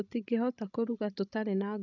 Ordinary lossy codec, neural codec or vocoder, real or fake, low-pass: none; codec, 16 kHz, 16 kbps, FreqCodec, larger model; fake; 7.2 kHz